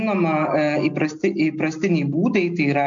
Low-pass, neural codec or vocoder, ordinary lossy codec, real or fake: 7.2 kHz; none; MP3, 64 kbps; real